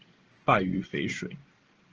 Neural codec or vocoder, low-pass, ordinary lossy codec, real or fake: none; 7.2 kHz; Opus, 16 kbps; real